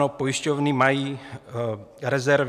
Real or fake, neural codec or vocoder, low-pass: real; none; 14.4 kHz